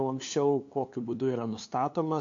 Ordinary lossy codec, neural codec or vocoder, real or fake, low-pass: AAC, 48 kbps; codec, 16 kHz, 2 kbps, FunCodec, trained on LibriTTS, 25 frames a second; fake; 7.2 kHz